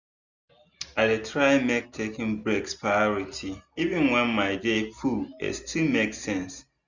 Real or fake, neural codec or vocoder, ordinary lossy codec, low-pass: real; none; none; 7.2 kHz